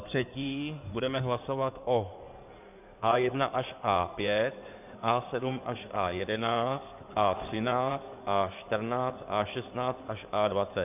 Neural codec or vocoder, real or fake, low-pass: codec, 16 kHz in and 24 kHz out, 2.2 kbps, FireRedTTS-2 codec; fake; 3.6 kHz